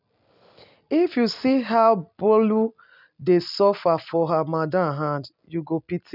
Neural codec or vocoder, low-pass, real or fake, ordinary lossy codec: none; 5.4 kHz; real; none